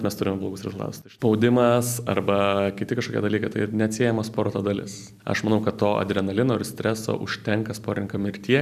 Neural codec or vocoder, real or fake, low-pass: none; real; 14.4 kHz